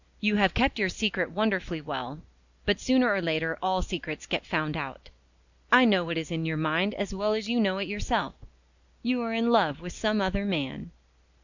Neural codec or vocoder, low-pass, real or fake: none; 7.2 kHz; real